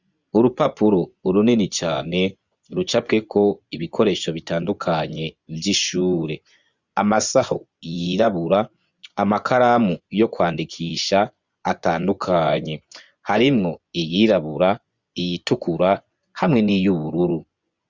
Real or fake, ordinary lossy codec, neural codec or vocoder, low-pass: fake; Opus, 64 kbps; vocoder, 24 kHz, 100 mel bands, Vocos; 7.2 kHz